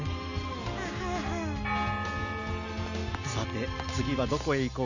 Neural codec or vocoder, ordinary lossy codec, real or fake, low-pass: none; none; real; 7.2 kHz